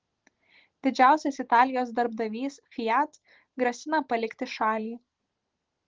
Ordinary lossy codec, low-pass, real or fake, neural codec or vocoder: Opus, 16 kbps; 7.2 kHz; real; none